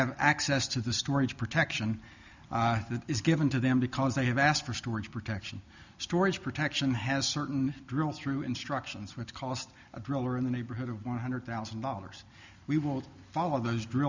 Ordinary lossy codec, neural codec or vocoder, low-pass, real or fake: Opus, 64 kbps; none; 7.2 kHz; real